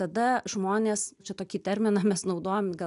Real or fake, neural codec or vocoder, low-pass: real; none; 10.8 kHz